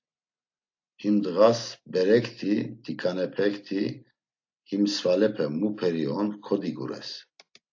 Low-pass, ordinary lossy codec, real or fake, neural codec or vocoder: 7.2 kHz; AAC, 48 kbps; real; none